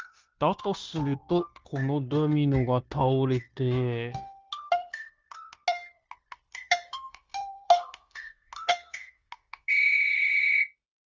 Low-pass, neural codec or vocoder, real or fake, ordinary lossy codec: 7.2 kHz; codec, 16 kHz, 0.9 kbps, LongCat-Audio-Codec; fake; Opus, 32 kbps